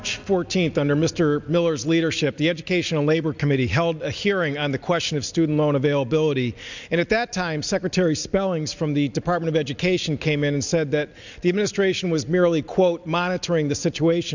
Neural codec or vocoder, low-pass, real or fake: none; 7.2 kHz; real